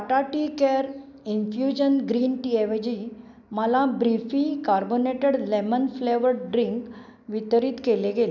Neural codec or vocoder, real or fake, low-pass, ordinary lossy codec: none; real; 7.2 kHz; none